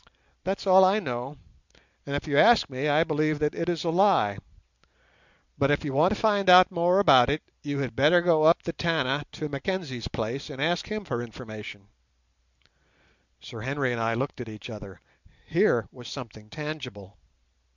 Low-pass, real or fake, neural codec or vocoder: 7.2 kHz; real; none